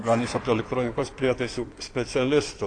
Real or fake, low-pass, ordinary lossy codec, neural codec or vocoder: fake; 9.9 kHz; AAC, 48 kbps; codec, 16 kHz in and 24 kHz out, 2.2 kbps, FireRedTTS-2 codec